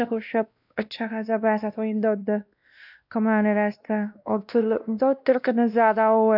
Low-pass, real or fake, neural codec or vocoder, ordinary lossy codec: 5.4 kHz; fake; codec, 16 kHz, 1 kbps, X-Codec, WavLM features, trained on Multilingual LibriSpeech; AAC, 48 kbps